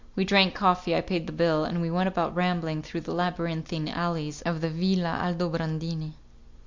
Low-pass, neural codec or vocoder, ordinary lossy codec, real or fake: 7.2 kHz; none; MP3, 64 kbps; real